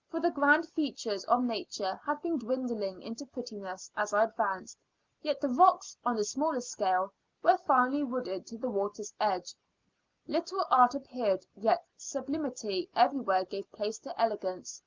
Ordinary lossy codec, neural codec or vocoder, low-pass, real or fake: Opus, 32 kbps; none; 7.2 kHz; real